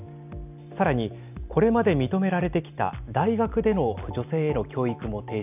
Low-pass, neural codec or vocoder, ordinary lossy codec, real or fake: 3.6 kHz; none; none; real